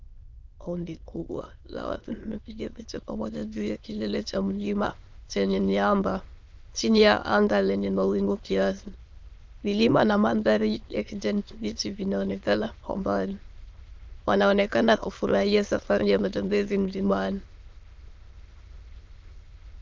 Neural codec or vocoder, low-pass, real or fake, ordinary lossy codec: autoencoder, 22.05 kHz, a latent of 192 numbers a frame, VITS, trained on many speakers; 7.2 kHz; fake; Opus, 24 kbps